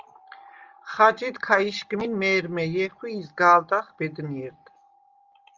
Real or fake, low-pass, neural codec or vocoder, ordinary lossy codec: real; 7.2 kHz; none; Opus, 32 kbps